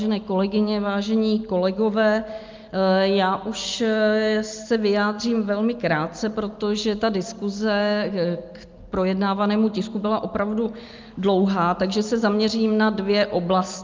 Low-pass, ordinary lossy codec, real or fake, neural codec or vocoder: 7.2 kHz; Opus, 24 kbps; real; none